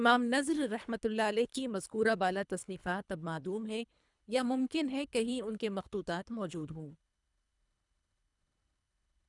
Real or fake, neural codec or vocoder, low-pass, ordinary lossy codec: fake; codec, 24 kHz, 3 kbps, HILCodec; 10.8 kHz; none